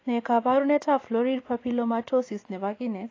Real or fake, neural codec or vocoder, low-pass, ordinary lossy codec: fake; vocoder, 24 kHz, 100 mel bands, Vocos; 7.2 kHz; AAC, 32 kbps